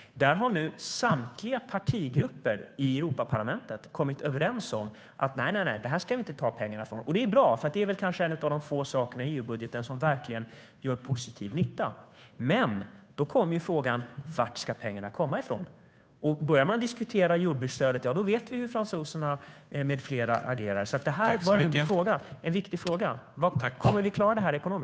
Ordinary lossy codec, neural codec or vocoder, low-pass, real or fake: none; codec, 16 kHz, 2 kbps, FunCodec, trained on Chinese and English, 25 frames a second; none; fake